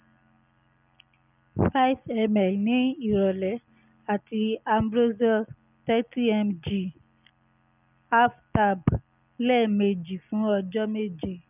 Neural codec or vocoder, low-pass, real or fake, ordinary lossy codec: none; 3.6 kHz; real; none